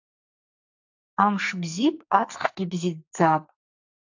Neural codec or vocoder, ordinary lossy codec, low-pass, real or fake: codec, 44.1 kHz, 2.6 kbps, SNAC; AAC, 48 kbps; 7.2 kHz; fake